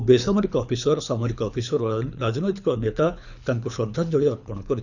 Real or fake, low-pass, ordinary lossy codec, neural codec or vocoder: fake; 7.2 kHz; none; codec, 24 kHz, 6 kbps, HILCodec